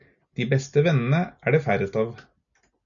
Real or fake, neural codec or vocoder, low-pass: real; none; 7.2 kHz